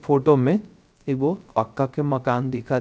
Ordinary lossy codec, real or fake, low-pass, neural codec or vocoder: none; fake; none; codec, 16 kHz, 0.3 kbps, FocalCodec